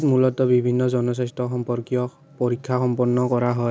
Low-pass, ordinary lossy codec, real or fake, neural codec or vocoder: none; none; real; none